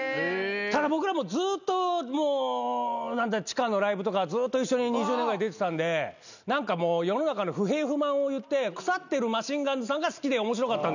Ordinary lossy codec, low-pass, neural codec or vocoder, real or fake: none; 7.2 kHz; none; real